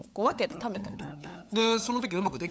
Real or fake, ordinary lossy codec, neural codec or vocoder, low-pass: fake; none; codec, 16 kHz, 8 kbps, FunCodec, trained on LibriTTS, 25 frames a second; none